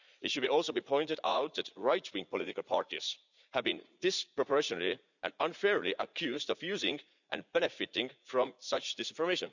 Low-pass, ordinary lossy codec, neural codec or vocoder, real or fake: 7.2 kHz; none; vocoder, 44.1 kHz, 80 mel bands, Vocos; fake